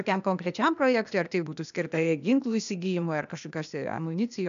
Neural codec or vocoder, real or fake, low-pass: codec, 16 kHz, 0.8 kbps, ZipCodec; fake; 7.2 kHz